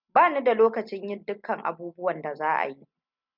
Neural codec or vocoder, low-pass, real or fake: none; 5.4 kHz; real